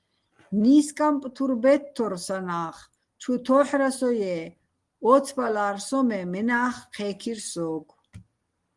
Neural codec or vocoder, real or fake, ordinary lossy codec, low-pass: none; real; Opus, 24 kbps; 10.8 kHz